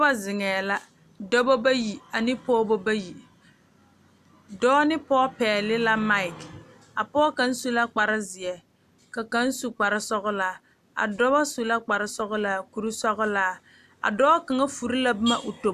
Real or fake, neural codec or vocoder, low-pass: real; none; 14.4 kHz